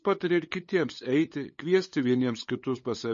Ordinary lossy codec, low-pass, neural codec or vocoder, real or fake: MP3, 32 kbps; 7.2 kHz; codec, 16 kHz, 8 kbps, FunCodec, trained on LibriTTS, 25 frames a second; fake